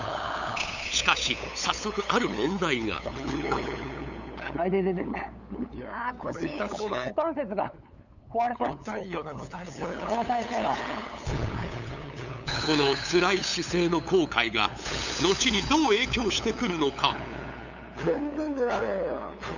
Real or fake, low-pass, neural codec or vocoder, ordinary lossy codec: fake; 7.2 kHz; codec, 16 kHz, 8 kbps, FunCodec, trained on LibriTTS, 25 frames a second; none